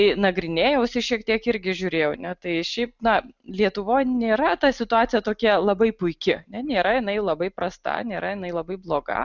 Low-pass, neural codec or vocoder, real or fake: 7.2 kHz; none; real